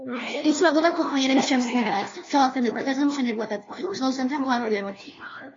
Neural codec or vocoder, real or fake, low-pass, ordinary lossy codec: codec, 16 kHz, 1 kbps, FunCodec, trained on LibriTTS, 50 frames a second; fake; 7.2 kHz; AAC, 32 kbps